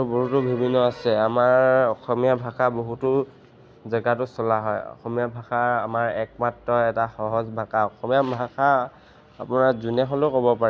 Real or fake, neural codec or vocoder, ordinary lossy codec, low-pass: real; none; none; none